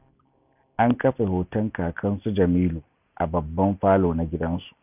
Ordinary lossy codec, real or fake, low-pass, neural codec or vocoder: none; real; 3.6 kHz; none